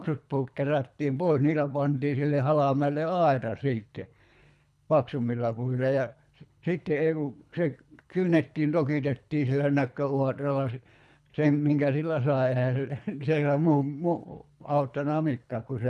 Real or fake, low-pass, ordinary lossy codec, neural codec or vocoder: fake; none; none; codec, 24 kHz, 3 kbps, HILCodec